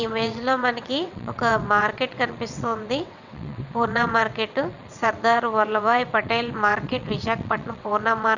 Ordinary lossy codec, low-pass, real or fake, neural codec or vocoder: none; 7.2 kHz; fake; vocoder, 22.05 kHz, 80 mel bands, WaveNeXt